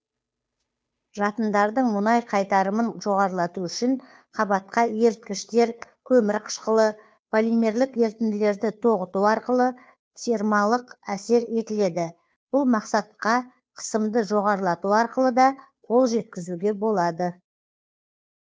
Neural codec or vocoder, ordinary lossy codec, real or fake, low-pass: codec, 16 kHz, 2 kbps, FunCodec, trained on Chinese and English, 25 frames a second; none; fake; none